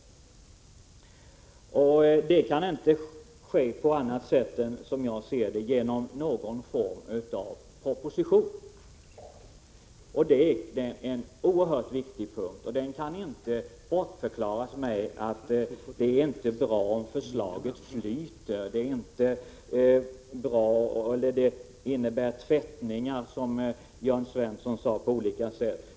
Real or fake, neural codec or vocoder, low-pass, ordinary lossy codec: real; none; none; none